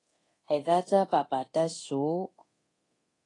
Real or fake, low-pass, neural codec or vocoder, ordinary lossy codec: fake; 10.8 kHz; codec, 24 kHz, 0.9 kbps, DualCodec; AAC, 32 kbps